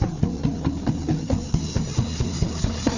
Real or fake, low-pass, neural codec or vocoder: fake; 7.2 kHz; codec, 16 kHz, 4 kbps, FreqCodec, larger model